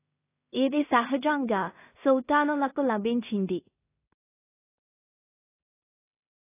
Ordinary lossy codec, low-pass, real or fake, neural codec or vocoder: AAC, 24 kbps; 3.6 kHz; fake; codec, 16 kHz in and 24 kHz out, 0.4 kbps, LongCat-Audio-Codec, two codebook decoder